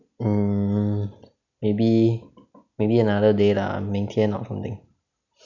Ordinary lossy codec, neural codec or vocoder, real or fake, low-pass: none; none; real; 7.2 kHz